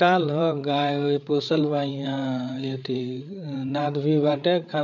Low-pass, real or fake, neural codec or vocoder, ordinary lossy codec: 7.2 kHz; fake; codec, 16 kHz, 8 kbps, FreqCodec, larger model; none